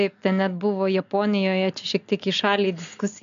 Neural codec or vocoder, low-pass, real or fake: none; 7.2 kHz; real